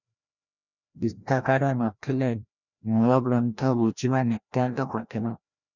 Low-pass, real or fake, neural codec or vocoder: 7.2 kHz; fake; codec, 16 kHz, 1 kbps, FreqCodec, larger model